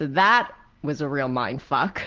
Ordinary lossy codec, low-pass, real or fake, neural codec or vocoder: Opus, 16 kbps; 7.2 kHz; real; none